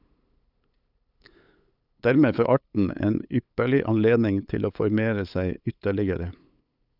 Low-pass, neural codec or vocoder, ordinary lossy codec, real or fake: 5.4 kHz; codec, 16 kHz, 8 kbps, FunCodec, trained on LibriTTS, 25 frames a second; none; fake